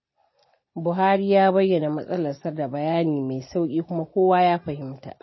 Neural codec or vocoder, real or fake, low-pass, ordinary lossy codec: none; real; 7.2 kHz; MP3, 24 kbps